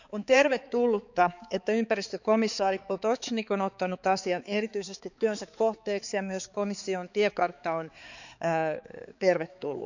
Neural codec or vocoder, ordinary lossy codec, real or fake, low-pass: codec, 16 kHz, 4 kbps, X-Codec, HuBERT features, trained on balanced general audio; none; fake; 7.2 kHz